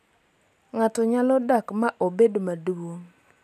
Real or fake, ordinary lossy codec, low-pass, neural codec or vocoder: real; none; 14.4 kHz; none